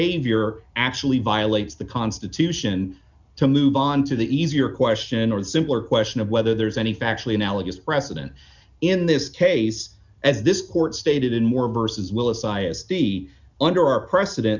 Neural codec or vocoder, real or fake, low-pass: none; real; 7.2 kHz